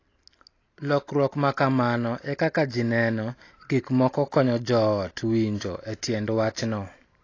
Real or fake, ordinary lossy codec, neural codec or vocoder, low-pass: real; AAC, 32 kbps; none; 7.2 kHz